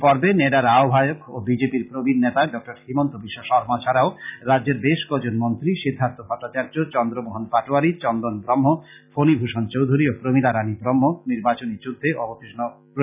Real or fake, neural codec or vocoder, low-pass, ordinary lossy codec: real; none; 3.6 kHz; none